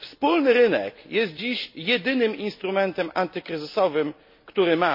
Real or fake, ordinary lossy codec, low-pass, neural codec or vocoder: real; MP3, 32 kbps; 5.4 kHz; none